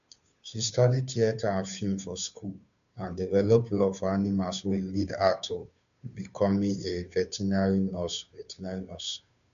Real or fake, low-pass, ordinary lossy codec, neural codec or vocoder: fake; 7.2 kHz; none; codec, 16 kHz, 2 kbps, FunCodec, trained on Chinese and English, 25 frames a second